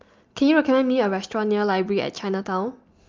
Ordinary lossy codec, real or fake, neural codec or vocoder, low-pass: Opus, 24 kbps; real; none; 7.2 kHz